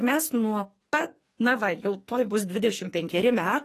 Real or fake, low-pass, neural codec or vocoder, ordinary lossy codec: fake; 14.4 kHz; codec, 44.1 kHz, 2.6 kbps, SNAC; AAC, 48 kbps